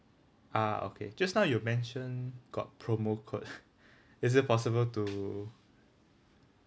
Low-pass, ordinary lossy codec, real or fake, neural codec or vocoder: none; none; real; none